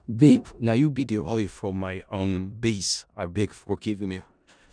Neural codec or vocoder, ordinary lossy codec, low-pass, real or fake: codec, 16 kHz in and 24 kHz out, 0.4 kbps, LongCat-Audio-Codec, four codebook decoder; none; 9.9 kHz; fake